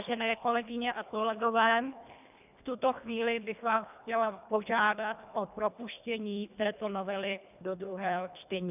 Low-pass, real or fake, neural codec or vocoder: 3.6 kHz; fake; codec, 24 kHz, 1.5 kbps, HILCodec